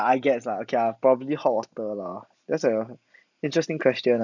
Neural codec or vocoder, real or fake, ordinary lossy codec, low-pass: none; real; none; 7.2 kHz